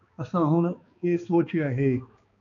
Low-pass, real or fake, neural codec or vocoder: 7.2 kHz; fake; codec, 16 kHz, 2 kbps, X-Codec, HuBERT features, trained on balanced general audio